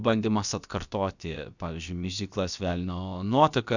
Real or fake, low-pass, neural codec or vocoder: fake; 7.2 kHz; codec, 16 kHz, about 1 kbps, DyCAST, with the encoder's durations